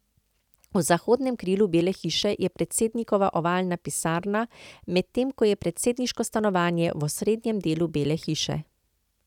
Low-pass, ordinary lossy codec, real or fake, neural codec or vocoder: 19.8 kHz; none; real; none